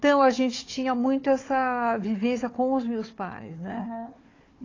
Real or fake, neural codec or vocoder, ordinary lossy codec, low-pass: fake; codec, 16 kHz, 4 kbps, FunCodec, trained on Chinese and English, 50 frames a second; AAC, 32 kbps; 7.2 kHz